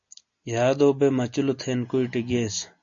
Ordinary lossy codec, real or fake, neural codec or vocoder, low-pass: MP3, 48 kbps; real; none; 7.2 kHz